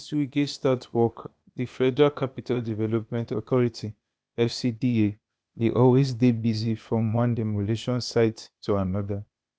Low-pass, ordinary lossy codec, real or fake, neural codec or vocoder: none; none; fake; codec, 16 kHz, 0.8 kbps, ZipCodec